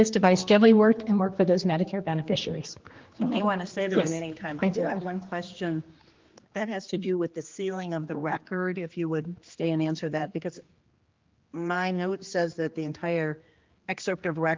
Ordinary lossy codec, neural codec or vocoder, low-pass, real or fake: Opus, 32 kbps; codec, 16 kHz, 2 kbps, X-Codec, HuBERT features, trained on general audio; 7.2 kHz; fake